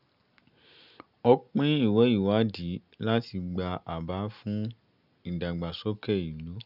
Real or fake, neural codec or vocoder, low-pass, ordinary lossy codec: real; none; 5.4 kHz; none